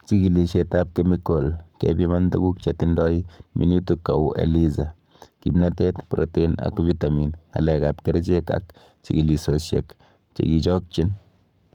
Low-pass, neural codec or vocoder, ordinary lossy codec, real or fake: 19.8 kHz; codec, 44.1 kHz, 7.8 kbps, Pupu-Codec; none; fake